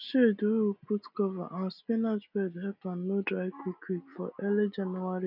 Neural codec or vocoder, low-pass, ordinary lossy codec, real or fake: none; 5.4 kHz; none; real